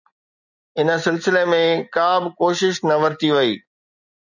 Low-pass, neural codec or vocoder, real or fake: 7.2 kHz; none; real